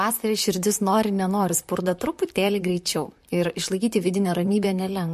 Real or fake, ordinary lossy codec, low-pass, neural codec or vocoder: fake; MP3, 64 kbps; 14.4 kHz; vocoder, 44.1 kHz, 128 mel bands, Pupu-Vocoder